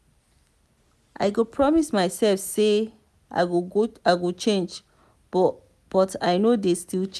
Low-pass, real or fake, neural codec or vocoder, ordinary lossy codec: none; real; none; none